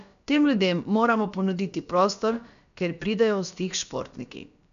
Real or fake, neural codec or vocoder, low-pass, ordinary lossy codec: fake; codec, 16 kHz, about 1 kbps, DyCAST, with the encoder's durations; 7.2 kHz; none